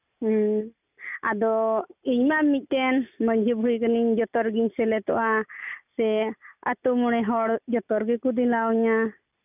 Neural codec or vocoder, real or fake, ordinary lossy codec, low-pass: none; real; none; 3.6 kHz